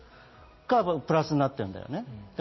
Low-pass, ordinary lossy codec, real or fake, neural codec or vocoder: 7.2 kHz; MP3, 24 kbps; real; none